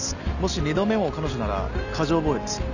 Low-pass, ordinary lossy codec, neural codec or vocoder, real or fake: 7.2 kHz; none; none; real